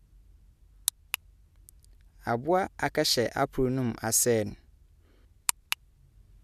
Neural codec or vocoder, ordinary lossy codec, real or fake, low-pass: none; none; real; 14.4 kHz